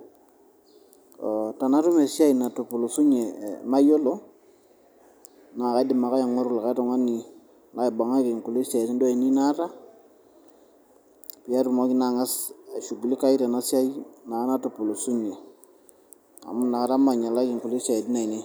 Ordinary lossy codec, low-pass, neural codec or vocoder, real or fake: none; none; none; real